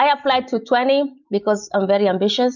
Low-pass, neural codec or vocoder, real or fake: 7.2 kHz; none; real